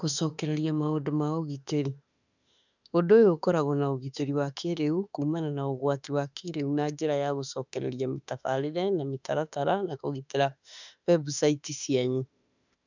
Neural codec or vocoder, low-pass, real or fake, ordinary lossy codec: autoencoder, 48 kHz, 32 numbers a frame, DAC-VAE, trained on Japanese speech; 7.2 kHz; fake; none